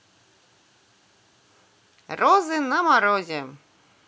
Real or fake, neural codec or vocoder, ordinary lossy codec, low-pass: real; none; none; none